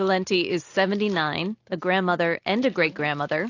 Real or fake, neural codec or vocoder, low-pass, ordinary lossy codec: real; none; 7.2 kHz; AAC, 48 kbps